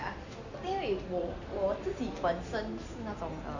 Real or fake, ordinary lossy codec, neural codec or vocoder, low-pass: real; none; none; 7.2 kHz